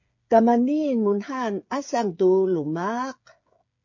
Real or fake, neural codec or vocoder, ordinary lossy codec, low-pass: fake; codec, 16 kHz, 8 kbps, FreqCodec, smaller model; MP3, 48 kbps; 7.2 kHz